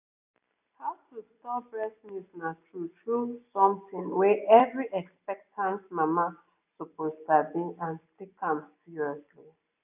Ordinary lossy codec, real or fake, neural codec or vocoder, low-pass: none; real; none; 3.6 kHz